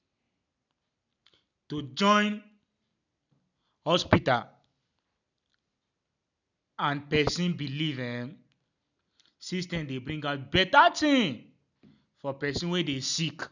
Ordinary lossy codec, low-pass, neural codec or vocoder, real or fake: none; 7.2 kHz; none; real